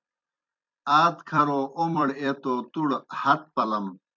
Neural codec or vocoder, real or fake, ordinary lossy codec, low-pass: vocoder, 44.1 kHz, 128 mel bands every 256 samples, BigVGAN v2; fake; MP3, 64 kbps; 7.2 kHz